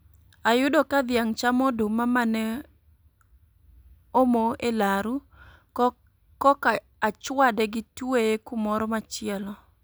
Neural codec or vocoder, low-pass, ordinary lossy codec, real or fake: none; none; none; real